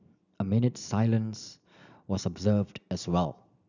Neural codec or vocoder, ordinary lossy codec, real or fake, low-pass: none; none; real; 7.2 kHz